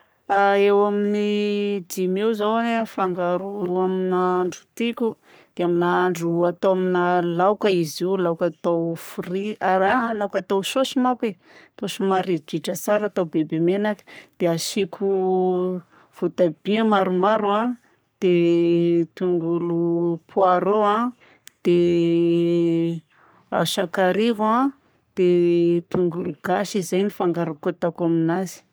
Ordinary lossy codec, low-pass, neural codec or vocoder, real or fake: none; none; codec, 44.1 kHz, 3.4 kbps, Pupu-Codec; fake